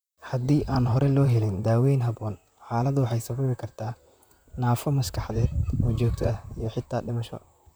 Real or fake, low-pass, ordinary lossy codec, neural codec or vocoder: fake; none; none; vocoder, 44.1 kHz, 128 mel bands, Pupu-Vocoder